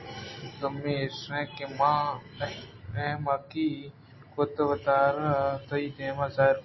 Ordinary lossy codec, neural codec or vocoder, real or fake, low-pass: MP3, 24 kbps; none; real; 7.2 kHz